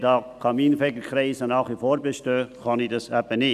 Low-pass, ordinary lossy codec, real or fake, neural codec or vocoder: 14.4 kHz; none; real; none